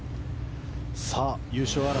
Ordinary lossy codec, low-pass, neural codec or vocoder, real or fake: none; none; none; real